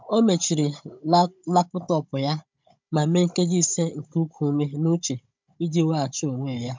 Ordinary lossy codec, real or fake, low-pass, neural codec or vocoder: MP3, 64 kbps; fake; 7.2 kHz; codec, 16 kHz, 16 kbps, FunCodec, trained on Chinese and English, 50 frames a second